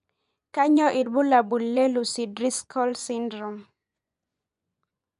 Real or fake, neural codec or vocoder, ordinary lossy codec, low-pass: fake; vocoder, 24 kHz, 100 mel bands, Vocos; none; 10.8 kHz